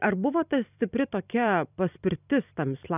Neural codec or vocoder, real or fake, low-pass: vocoder, 44.1 kHz, 128 mel bands every 256 samples, BigVGAN v2; fake; 3.6 kHz